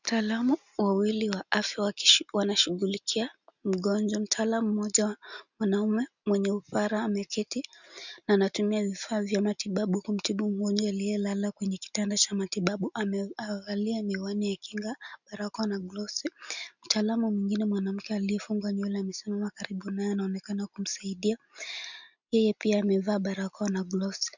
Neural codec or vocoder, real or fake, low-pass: none; real; 7.2 kHz